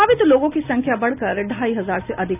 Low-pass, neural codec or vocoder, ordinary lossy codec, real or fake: 3.6 kHz; none; none; real